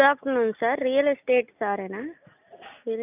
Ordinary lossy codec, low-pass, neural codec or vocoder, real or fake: none; 3.6 kHz; none; real